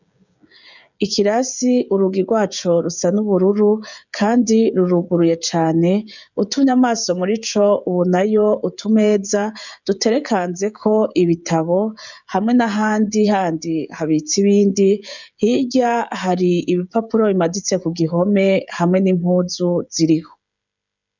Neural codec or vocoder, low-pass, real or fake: codec, 16 kHz, 16 kbps, FreqCodec, smaller model; 7.2 kHz; fake